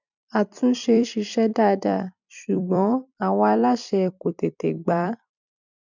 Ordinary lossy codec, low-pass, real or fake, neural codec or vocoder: none; 7.2 kHz; fake; vocoder, 44.1 kHz, 128 mel bands every 256 samples, BigVGAN v2